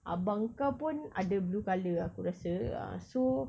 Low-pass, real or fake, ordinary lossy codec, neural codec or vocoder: none; real; none; none